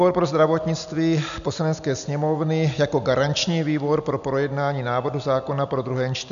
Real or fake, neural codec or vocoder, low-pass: real; none; 7.2 kHz